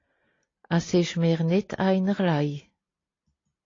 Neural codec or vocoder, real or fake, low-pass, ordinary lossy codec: none; real; 7.2 kHz; AAC, 32 kbps